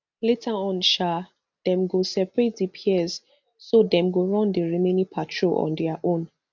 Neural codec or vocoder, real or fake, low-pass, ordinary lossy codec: none; real; 7.2 kHz; none